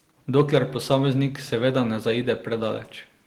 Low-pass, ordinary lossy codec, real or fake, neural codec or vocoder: 19.8 kHz; Opus, 16 kbps; real; none